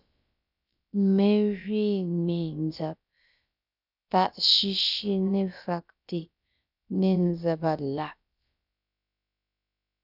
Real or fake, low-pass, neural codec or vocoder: fake; 5.4 kHz; codec, 16 kHz, about 1 kbps, DyCAST, with the encoder's durations